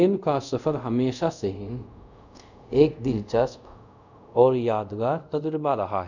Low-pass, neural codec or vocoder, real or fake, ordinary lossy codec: 7.2 kHz; codec, 24 kHz, 0.5 kbps, DualCodec; fake; none